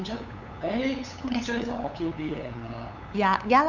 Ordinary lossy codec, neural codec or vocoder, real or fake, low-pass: none; codec, 16 kHz, 8 kbps, FunCodec, trained on LibriTTS, 25 frames a second; fake; 7.2 kHz